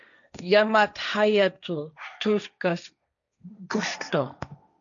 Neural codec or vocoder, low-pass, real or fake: codec, 16 kHz, 1.1 kbps, Voila-Tokenizer; 7.2 kHz; fake